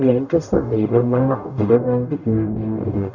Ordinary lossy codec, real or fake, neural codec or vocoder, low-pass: none; fake; codec, 44.1 kHz, 0.9 kbps, DAC; 7.2 kHz